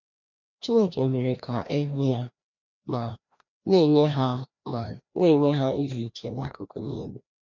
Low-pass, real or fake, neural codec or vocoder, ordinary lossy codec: 7.2 kHz; fake; codec, 16 kHz, 1 kbps, FreqCodec, larger model; none